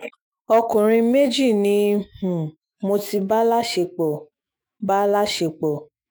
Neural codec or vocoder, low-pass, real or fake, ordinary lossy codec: autoencoder, 48 kHz, 128 numbers a frame, DAC-VAE, trained on Japanese speech; none; fake; none